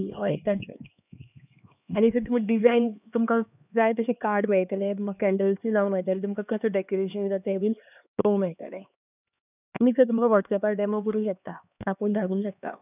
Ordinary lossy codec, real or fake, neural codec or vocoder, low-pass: none; fake; codec, 16 kHz, 2 kbps, X-Codec, HuBERT features, trained on LibriSpeech; 3.6 kHz